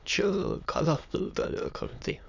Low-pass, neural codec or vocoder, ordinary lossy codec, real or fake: 7.2 kHz; autoencoder, 22.05 kHz, a latent of 192 numbers a frame, VITS, trained on many speakers; none; fake